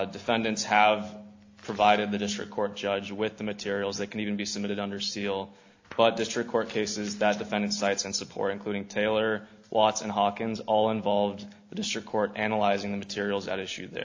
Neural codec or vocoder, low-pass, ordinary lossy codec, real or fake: none; 7.2 kHz; AAC, 48 kbps; real